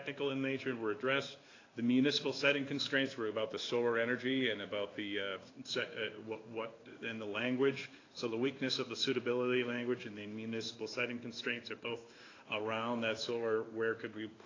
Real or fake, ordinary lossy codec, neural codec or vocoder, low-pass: fake; AAC, 32 kbps; codec, 16 kHz in and 24 kHz out, 1 kbps, XY-Tokenizer; 7.2 kHz